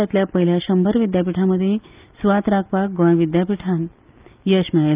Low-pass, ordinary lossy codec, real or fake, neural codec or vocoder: 3.6 kHz; Opus, 24 kbps; real; none